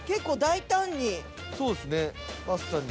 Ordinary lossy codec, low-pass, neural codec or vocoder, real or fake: none; none; none; real